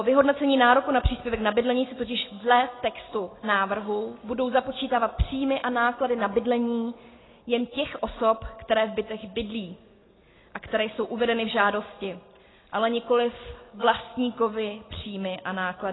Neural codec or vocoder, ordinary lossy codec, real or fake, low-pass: none; AAC, 16 kbps; real; 7.2 kHz